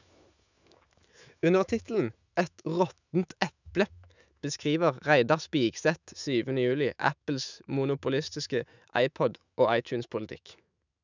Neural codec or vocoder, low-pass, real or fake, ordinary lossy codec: autoencoder, 48 kHz, 128 numbers a frame, DAC-VAE, trained on Japanese speech; 7.2 kHz; fake; none